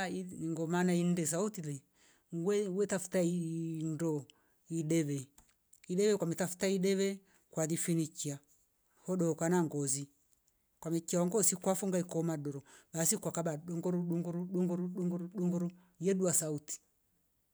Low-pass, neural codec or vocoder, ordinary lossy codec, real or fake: none; none; none; real